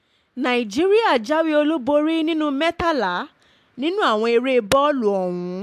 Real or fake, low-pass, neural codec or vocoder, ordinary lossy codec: real; 14.4 kHz; none; AAC, 96 kbps